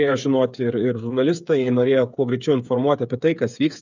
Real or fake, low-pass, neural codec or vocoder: fake; 7.2 kHz; codec, 16 kHz, 8 kbps, FreqCodec, smaller model